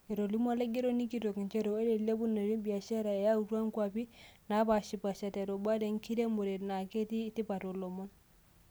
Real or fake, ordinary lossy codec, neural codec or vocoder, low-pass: real; none; none; none